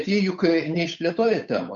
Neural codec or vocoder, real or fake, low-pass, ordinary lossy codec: codec, 16 kHz, 8 kbps, FunCodec, trained on Chinese and English, 25 frames a second; fake; 7.2 kHz; MP3, 64 kbps